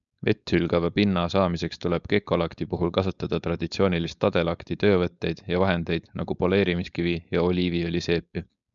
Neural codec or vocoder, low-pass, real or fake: codec, 16 kHz, 4.8 kbps, FACodec; 7.2 kHz; fake